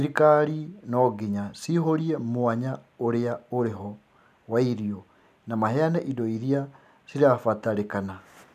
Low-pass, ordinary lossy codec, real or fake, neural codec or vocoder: 14.4 kHz; none; real; none